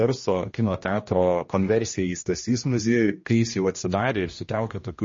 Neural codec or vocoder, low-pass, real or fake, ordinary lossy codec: codec, 16 kHz, 1 kbps, X-Codec, HuBERT features, trained on general audio; 7.2 kHz; fake; MP3, 32 kbps